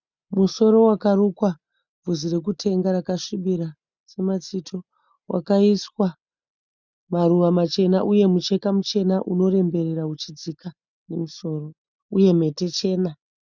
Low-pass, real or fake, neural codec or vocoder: 7.2 kHz; real; none